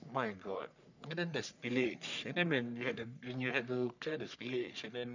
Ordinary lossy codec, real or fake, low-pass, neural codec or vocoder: none; fake; 7.2 kHz; codec, 44.1 kHz, 3.4 kbps, Pupu-Codec